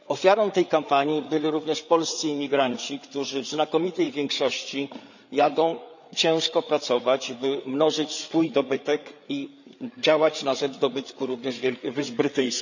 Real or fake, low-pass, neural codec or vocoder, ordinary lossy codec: fake; 7.2 kHz; codec, 16 kHz, 8 kbps, FreqCodec, larger model; none